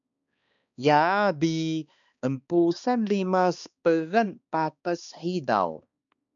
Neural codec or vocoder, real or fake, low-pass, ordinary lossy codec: codec, 16 kHz, 2 kbps, X-Codec, HuBERT features, trained on balanced general audio; fake; 7.2 kHz; MP3, 96 kbps